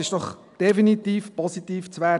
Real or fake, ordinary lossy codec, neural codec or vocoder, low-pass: real; none; none; 10.8 kHz